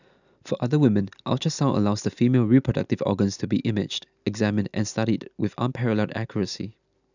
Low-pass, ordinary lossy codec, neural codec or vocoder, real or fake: 7.2 kHz; none; none; real